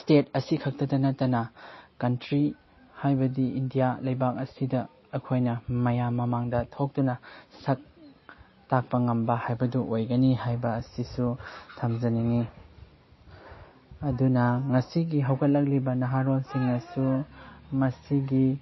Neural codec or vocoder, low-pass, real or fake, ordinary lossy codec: none; 7.2 kHz; real; MP3, 24 kbps